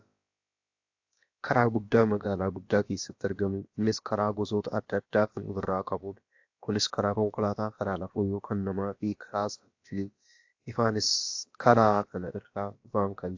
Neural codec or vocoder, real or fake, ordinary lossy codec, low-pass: codec, 16 kHz, about 1 kbps, DyCAST, with the encoder's durations; fake; AAC, 48 kbps; 7.2 kHz